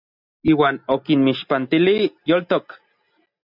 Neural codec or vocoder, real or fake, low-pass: none; real; 5.4 kHz